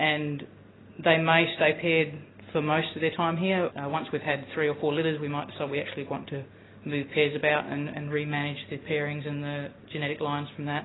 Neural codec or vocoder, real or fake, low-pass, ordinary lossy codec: none; real; 7.2 kHz; AAC, 16 kbps